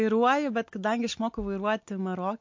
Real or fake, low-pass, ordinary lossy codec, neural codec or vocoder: real; 7.2 kHz; MP3, 48 kbps; none